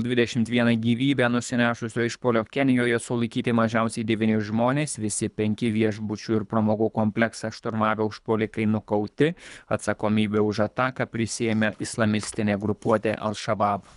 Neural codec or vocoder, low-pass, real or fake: codec, 24 kHz, 3 kbps, HILCodec; 10.8 kHz; fake